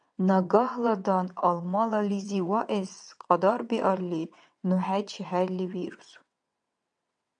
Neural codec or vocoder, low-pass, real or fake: vocoder, 22.05 kHz, 80 mel bands, WaveNeXt; 9.9 kHz; fake